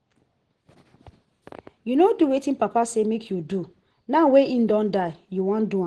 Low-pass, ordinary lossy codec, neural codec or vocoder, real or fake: 10.8 kHz; Opus, 16 kbps; none; real